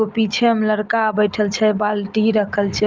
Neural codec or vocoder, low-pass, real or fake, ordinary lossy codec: none; 7.2 kHz; real; Opus, 24 kbps